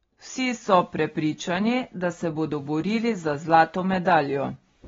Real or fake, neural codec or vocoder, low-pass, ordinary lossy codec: real; none; 7.2 kHz; AAC, 24 kbps